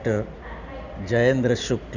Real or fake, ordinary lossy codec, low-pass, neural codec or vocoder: real; none; 7.2 kHz; none